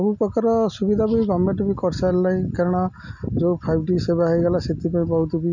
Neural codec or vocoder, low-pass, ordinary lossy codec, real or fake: none; 7.2 kHz; none; real